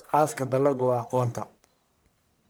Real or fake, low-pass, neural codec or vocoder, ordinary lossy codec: fake; none; codec, 44.1 kHz, 1.7 kbps, Pupu-Codec; none